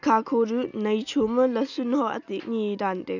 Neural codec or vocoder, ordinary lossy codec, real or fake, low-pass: none; none; real; 7.2 kHz